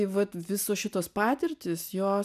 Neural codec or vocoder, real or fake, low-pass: none; real; 14.4 kHz